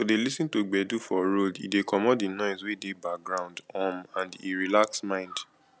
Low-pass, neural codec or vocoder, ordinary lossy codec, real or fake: none; none; none; real